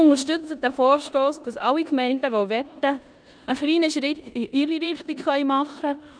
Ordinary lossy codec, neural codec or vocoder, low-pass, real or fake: none; codec, 16 kHz in and 24 kHz out, 0.9 kbps, LongCat-Audio-Codec, four codebook decoder; 9.9 kHz; fake